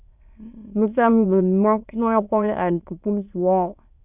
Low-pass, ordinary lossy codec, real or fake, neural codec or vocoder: 3.6 kHz; Opus, 64 kbps; fake; autoencoder, 22.05 kHz, a latent of 192 numbers a frame, VITS, trained on many speakers